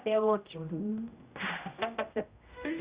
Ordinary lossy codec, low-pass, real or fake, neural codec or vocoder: Opus, 64 kbps; 3.6 kHz; fake; codec, 16 kHz, 0.5 kbps, X-Codec, HuBERT features, trained on general audio